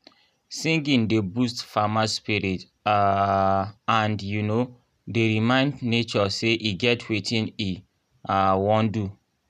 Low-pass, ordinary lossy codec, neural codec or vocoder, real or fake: 14.4 kHz; none; none; real